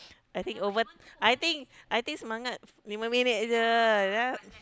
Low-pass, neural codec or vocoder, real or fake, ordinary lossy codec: none; none; real; none